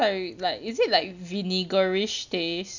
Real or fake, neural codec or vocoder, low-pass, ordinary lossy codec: real; none; 7.2 kHz; none